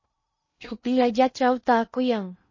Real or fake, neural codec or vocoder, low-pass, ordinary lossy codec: fake; codec, 16 kHz in and 24 kHz out, 0.6 kbps, FocalCodec, streaming, 2048 codes; 7.2 kHz; MP3, 32 kbps